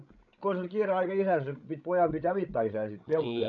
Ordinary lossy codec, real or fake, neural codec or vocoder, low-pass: none; fake; codec, 16 kHz, 16 kbps, FreqCodec, larger model; 7.2 kHz